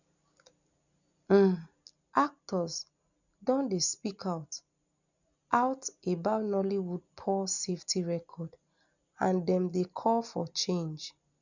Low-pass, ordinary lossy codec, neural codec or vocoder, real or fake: 7.2 kHz; none; none; real